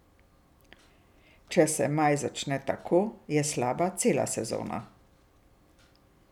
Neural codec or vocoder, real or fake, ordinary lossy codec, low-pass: none; real; none; 19.8 kHz